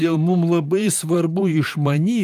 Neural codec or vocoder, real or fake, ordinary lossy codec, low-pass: vocoder, 44.1 kHz, 128 mel bands, Pupu-Vocoder; fake; Opus, 32 kbps; 14.4 kHz